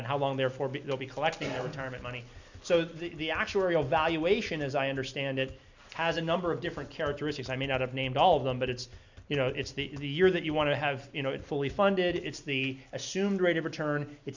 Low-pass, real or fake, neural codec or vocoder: 7.2 kHz; real; none